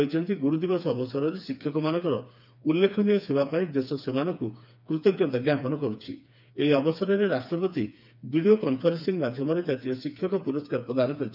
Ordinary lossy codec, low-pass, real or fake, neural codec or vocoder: none; 5.4 kHz; fake; codec, 16 kHz, 4 kbps, FreqCodec, smaller model